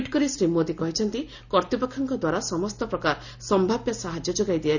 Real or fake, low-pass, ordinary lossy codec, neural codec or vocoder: real; 7.2 kHz; none; none